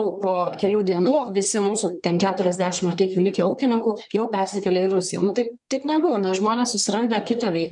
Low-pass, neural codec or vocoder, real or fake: 10.8 kHz; codec, 24 kHz, 1 kbps, SNAC; fake